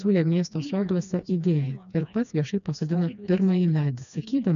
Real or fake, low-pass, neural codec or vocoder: fake; 7.2 kHz; codec, 16 kHz, 2 kbps, FreqCodec, smaller model